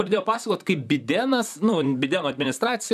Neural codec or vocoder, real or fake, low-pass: vocoder, 44.1 kHz, 128 mel bands, Pupu-Vocoder; fake; 14.4 kHz